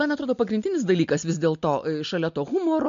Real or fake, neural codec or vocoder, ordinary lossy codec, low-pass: real; none; MP3, 48 kbps; 7.2 kHz